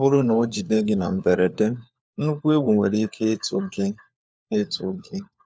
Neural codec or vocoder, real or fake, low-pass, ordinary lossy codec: codec, 16 kHz, 4 kbps, FunCodec, trained on LibriTTS, 50 frames a second; fake; none; none